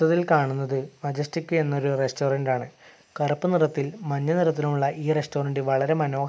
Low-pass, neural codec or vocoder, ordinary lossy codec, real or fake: none; none; none; real